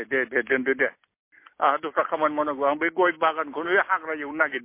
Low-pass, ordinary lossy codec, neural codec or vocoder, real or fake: 3.6 kHz; MP3, 24 kbps; none; real